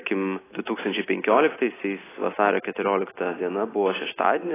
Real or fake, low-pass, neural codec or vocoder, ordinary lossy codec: real; 3.6 kHz; none; AAC, 16 kbps